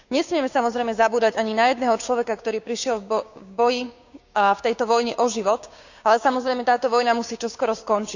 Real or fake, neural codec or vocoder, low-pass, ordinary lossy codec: fake; codec, 16 kHz, 6 kbps, DAC; 7.2 kHz; none